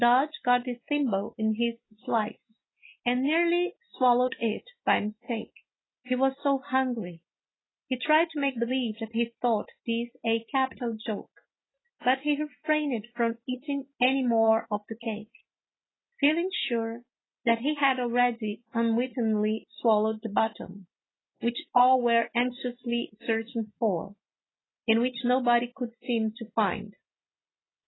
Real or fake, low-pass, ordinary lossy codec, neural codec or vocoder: real; 7.2 kHz; AAC, 16 kbps; none